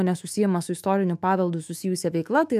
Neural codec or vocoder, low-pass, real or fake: autoencoder, 48 kHz, 128 numbers a frame, DAC-VAE, trained on Japanese speech; 14.4 kHz; fake